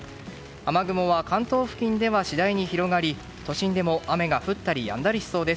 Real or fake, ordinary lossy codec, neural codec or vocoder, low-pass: real; none; none; none